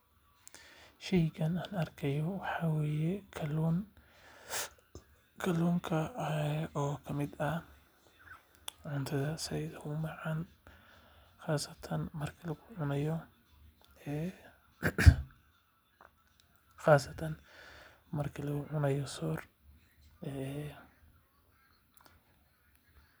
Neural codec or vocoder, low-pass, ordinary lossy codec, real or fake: none; none; none; real